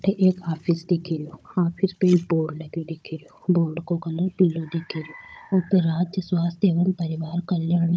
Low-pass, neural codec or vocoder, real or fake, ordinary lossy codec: none; codec, 16 kHz, 16 kbps, FunCodec, trained on Chinese and English, 50 frames a second; fake; none